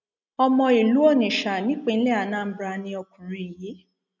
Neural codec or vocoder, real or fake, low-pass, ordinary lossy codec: none; real; 7.2 kHz; none